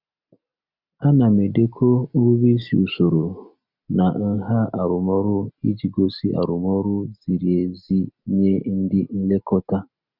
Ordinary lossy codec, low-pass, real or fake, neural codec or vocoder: none; 5.4 kHz; real; none